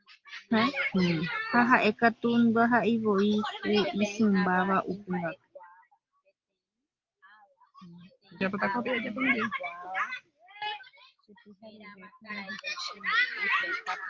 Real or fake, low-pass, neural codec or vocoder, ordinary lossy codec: real; 7.2 kHz; none; Opus, 32 kbps